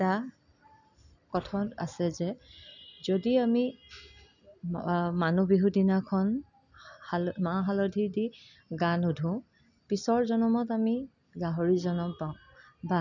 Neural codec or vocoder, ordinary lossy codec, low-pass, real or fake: none; none; 7.2 kHz; real